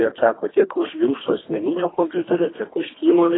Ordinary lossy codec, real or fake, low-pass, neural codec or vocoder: AAC, 16 kbps; fake; 7.2 kHz; codec, 24 kHz, 1.5 kbps, HILCodec